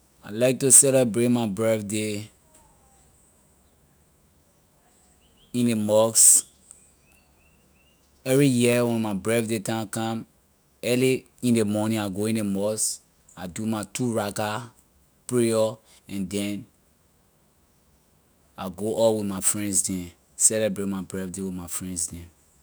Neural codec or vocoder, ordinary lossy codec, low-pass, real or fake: autoencoder, 48 kHz, 128 numbers a frame, DAC-VAE, trained on Japanese speech; none; none; fake